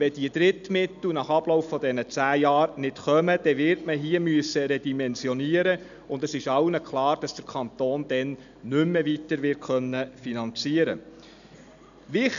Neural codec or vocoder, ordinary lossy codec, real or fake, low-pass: none; none; real; 7.2 kHz